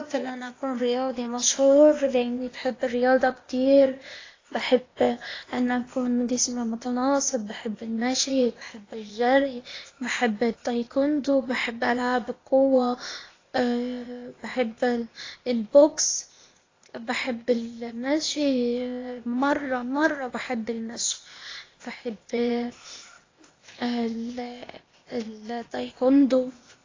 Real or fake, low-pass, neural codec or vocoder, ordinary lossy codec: fake; 7.2 kHz; codec, 16 kHz, 0.8 kbps, ZipCodec; AAC, 32 kbps